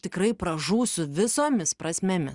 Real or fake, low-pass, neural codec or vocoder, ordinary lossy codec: real; 10.8 kHz; none; Opus, 64 kbps